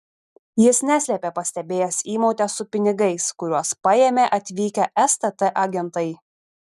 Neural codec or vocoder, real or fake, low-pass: none; real; 14.4 kHz